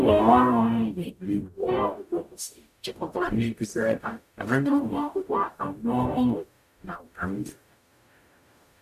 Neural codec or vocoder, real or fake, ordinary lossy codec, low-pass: codec, 44.1 kHz, 0.9 kbps, DAC; fake; none; 14.4 kHz